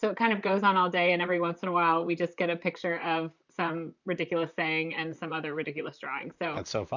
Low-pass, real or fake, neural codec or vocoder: 7.2 kHz; fake; vocoder, 44.1 kHz, 128 mel bands, Pupu-Vocoder